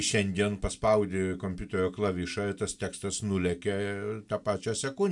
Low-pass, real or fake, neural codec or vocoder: 10.8 kHz; real; none